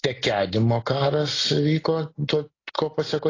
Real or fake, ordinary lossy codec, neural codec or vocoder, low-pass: real; AAC, 32 kbps; none; 7.2 kHz